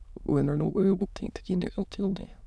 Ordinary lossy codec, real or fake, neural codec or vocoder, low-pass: none; fake; autoencoder, 22.05 kHz, a latent of 192 numbers a frame, VITS, trained on many speakers; none